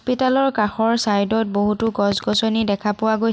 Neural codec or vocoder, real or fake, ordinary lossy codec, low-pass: none; real; none; none